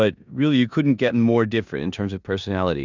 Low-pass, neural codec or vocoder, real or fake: 7.2 kHz; codec, 16 kHz in and 24 kHz out, 0.9 kbps, LongCat-Audio-Codec, four codebook decoder; fake